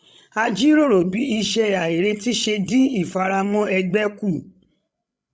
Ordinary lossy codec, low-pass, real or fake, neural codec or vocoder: none; none; fake; codec, 16 kHz, 16 kbps, FreqCodec, larger model